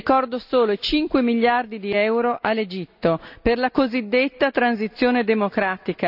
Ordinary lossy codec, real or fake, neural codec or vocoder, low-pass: none; real; none; 5.4 kHz